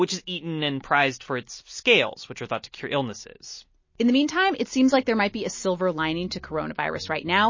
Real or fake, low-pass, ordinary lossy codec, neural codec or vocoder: real; 7.2 kHz; MP3, 32 kbps; none